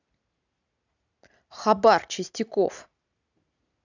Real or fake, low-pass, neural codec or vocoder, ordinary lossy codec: real; 7.2 kHz; none; none